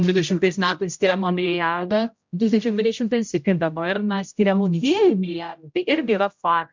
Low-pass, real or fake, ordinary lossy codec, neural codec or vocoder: 7.2 kHz; fake; MP3, 64 kbps; codec, 16 kHz, 0.5 kbps, X-Codec, HuBERT features, trained on general audio